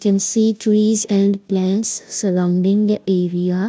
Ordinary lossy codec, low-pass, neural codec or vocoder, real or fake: none; none; codec, 16 kHz, 0.5 kbps, FunCodec, trained on Chinese and English, 25 frames a second; fake